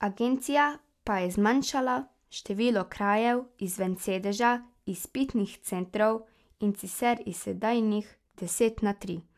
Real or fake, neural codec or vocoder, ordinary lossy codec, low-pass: real; none; AAC, 96 kbps; 14.4 kHz